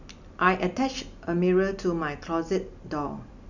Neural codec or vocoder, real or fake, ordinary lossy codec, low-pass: none; real; none; 7.2 kHz